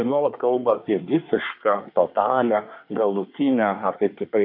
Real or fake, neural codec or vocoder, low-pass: fake; codec, 24 kHz, 1 kbps, SNAC; 5.4 kHz